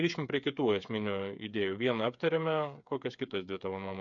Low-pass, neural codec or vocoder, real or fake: 7.2 kHz; codec, 16 kHz, 8 kbps, FreqCodec, smaller model; fake